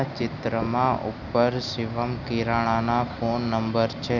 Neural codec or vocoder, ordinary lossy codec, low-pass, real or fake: none; none; 7.2 kHz; real